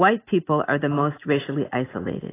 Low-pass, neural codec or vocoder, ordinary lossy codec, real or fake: 3.6 kHz; none; AAC, 16 kbps; real